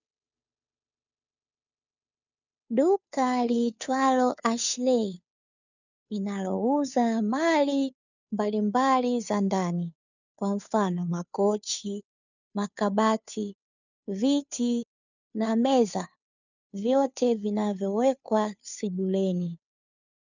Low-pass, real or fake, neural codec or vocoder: 7.2 kHz; fake; codec, 16 kHz, 2 kbps, FunCodec, trained on Chinese and English, 25 frames a second